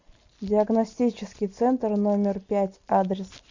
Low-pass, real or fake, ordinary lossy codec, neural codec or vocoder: 7.2 kHz; real; Opus, 64 kbps; none